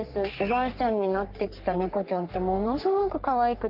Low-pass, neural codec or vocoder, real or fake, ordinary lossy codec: 5.4 kHz; codec, 44.1 kHz, 3.4 kbps, Pupu-Codec; fake; Opus, 32 kbps